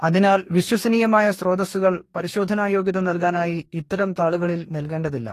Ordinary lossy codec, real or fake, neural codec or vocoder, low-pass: AAC, 48 kbps; fake; codec, 44.1 kHz, 2.6 kbps, DAC; 14.4 kHz